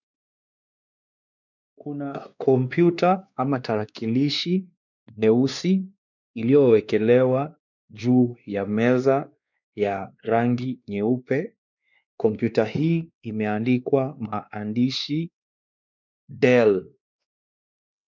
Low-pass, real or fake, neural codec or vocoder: 7.2 kHz; fake; codec, 16 kHz, 2 kbps, X-Codec, WavLM features, trained on Multilingual LibriSpeech